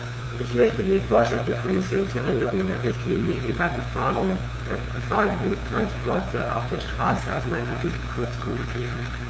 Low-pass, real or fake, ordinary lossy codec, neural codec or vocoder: none; fake; none; codec, 16 kHz, 2 kbps, FunCodec, trained on LibriTTS, 25 frames a second